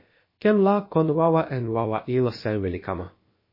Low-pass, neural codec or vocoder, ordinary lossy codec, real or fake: 5.4 kHz; codec, 16 kHz, about 1 kbps, DyCAST, with the encoder's durations; MP3, 24 kbps; fake